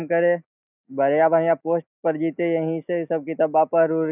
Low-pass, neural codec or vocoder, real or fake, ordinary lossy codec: 3.6 kHz; none; real; none